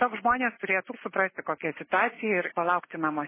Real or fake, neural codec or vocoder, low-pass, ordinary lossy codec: real; none; 3.6 kHz; MP3, 16 kbps